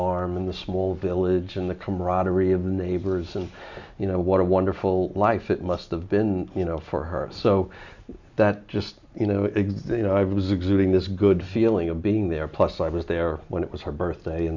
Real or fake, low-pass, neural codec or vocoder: real; 7.2 kHz; none